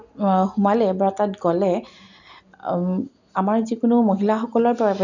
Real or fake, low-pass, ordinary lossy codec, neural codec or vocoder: real; 7.2 kHz; none; none